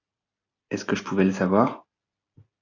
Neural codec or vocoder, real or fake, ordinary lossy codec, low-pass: none; real; AAC, 48 kbps; 7.2 kHz